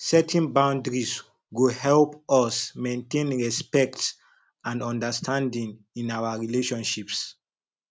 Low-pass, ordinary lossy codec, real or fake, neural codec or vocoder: none; none; real; none